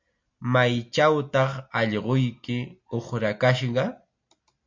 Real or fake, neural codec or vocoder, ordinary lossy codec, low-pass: real; none; MP3, 48 kbps; 7.2 kHz